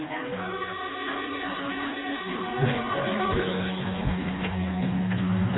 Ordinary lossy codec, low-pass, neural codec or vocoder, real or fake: AAC, 16 kbps; 7.2 kHz; codec, 16 kHz, 2 kbps, FreqCodec, smaller model; fake